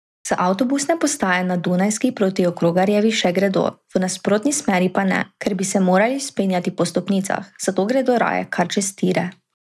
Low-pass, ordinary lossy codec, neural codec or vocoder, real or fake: none; none; none; real